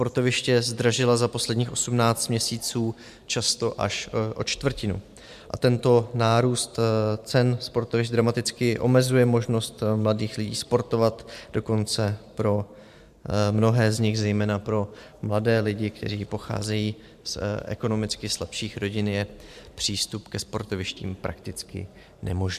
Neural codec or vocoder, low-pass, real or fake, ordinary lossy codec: vocoder, 44.1 kHz, 128 mel bands every 512 samples, BigVGAN v2; 14.4 kHz; fake; MP3, 96 kbps